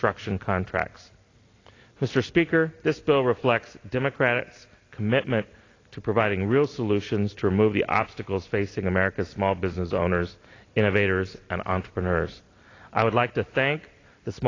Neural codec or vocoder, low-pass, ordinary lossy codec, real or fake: none; 7.2 kHz; AAC, 32 kbps; real